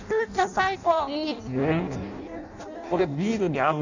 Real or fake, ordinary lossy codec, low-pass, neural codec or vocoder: fake; none; 7.2 kHz; codec, 16 kHz in and 24 kHz out, 0.6 kbps, FireRedTTS-2 codec